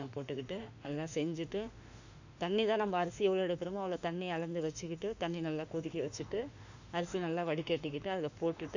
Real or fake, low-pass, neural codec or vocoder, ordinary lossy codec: fake; 7.2 kHz; autoencoder, 48 kHz, 32 numbers a frame, DAC-VAE, trained on Japanese speech; none